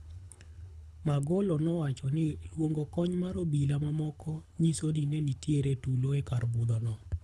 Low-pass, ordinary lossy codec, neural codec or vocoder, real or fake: none; none; codec, 24 kHz, 6 kbps, HILCodec; fake